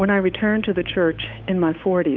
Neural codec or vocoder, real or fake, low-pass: codec, 16 kHz, 8 kbps, FunCodec, trained on Chinese and English, 25 frames a second; fake; 7.2 kHz